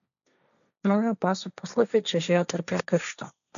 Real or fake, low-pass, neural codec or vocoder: fake; 7.2 kHz; codec, 16 kHz, 1.1 kbps, Voila-Tokenizer